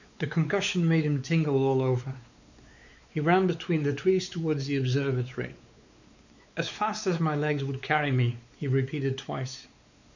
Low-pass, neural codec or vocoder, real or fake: 7.2 kHz; codec, 16 kHz, 4 kbps, X-Codec, WavLM features, trained on Multilingual LibriSpeech; fake